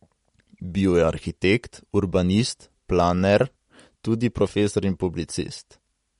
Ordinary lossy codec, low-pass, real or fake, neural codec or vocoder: MP3, 48 kbps; 19.8 kHz; real; none